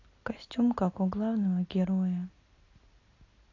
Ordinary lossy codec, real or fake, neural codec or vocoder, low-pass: AAC, 32 kbps; real; none; 7.2 kHz